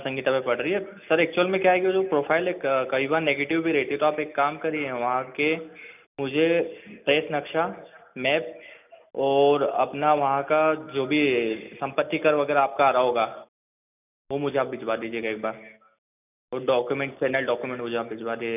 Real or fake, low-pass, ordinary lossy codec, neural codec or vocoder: real; 3.6 kHz; none; none